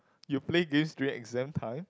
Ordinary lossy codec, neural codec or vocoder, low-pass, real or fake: none; none; none; real